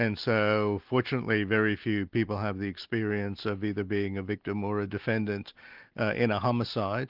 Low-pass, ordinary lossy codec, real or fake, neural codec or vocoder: 5.4 kHz; Opus, 24 kbps; real; none